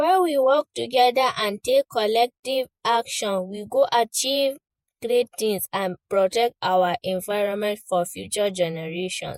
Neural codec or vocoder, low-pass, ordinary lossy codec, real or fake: vocoder, 48 kHz, 128 mel bands, Vocos; 19.8 kHz; MP3, 64 kbps; fake